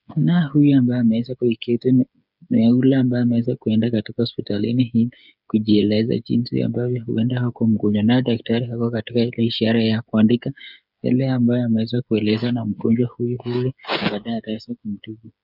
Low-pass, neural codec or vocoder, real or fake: 5.4 kHz; codec, 16 kHz, 8 kbps, FreqCodec, smaller model; fake